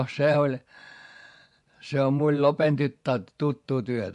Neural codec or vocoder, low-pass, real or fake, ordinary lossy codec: vocoder, 24 kHz, 100 mel bands, Vocos; 10.8 kHz; fake; MP3, 64 kbps